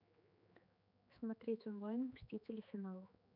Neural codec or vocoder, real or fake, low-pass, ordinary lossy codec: codec, 16 kHz, 4 kbps, X-Codec, HuBERT features, trained on general audio; fake; 5.4 kHz; none